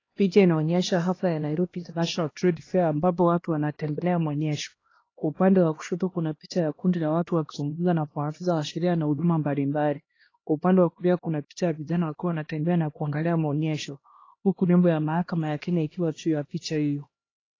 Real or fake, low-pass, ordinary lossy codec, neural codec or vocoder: fake; 7.2 kHz; AAC, 32 kbps; codec, 16 kHz, 1 kbps, X-Codec, HuBERT features, trained on LibriSpeech